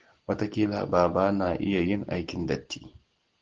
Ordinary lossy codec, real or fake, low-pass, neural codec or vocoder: Opus, 16 kbps; real; 7.2 kHz; none